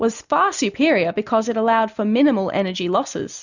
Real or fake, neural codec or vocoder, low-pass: real; none; 7.2 kHz